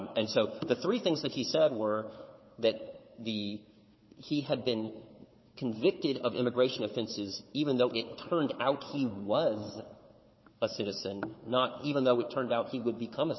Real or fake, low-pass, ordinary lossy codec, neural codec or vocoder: fake; 7.2 kHz; MP3, 24 kbps; codec, 16 kHz, 4 kbps, FunCodec, trained on Chinese and English, 50 frames a second